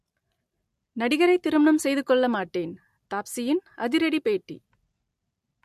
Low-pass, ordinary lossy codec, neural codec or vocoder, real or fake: 14.4 kHz; MP3, 64 kbps; none; real